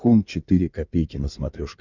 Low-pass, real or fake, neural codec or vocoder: 7.2 kHz; fake; codec, 16 kHz in and 24 kHz out, 2.2 kbps, FireRedTTS-2 codec